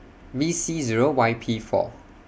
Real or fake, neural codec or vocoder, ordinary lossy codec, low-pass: real; none; none; none